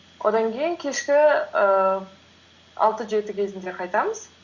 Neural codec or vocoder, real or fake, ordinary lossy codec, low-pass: none; real; Opus, 64 kbps; 7.2 kHz